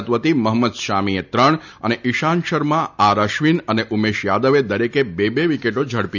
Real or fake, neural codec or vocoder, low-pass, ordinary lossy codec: real; none; 7.2 kHz; none